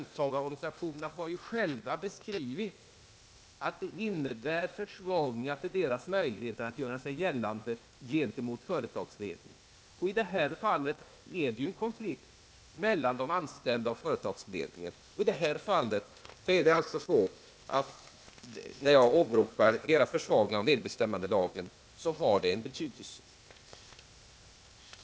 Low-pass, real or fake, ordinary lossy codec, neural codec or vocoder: none; fake; none; codec, 16 kHz, 0.8 kbps, ZipCodec